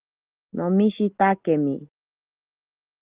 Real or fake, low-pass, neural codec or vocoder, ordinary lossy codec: real; 3.6 kHz; none; Opus, 16 kbps